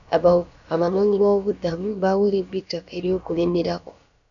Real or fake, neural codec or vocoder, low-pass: fake; codec, 16 kHz, about 1 kbps, DyCAST, with the encoder's durations; 7.2 kHz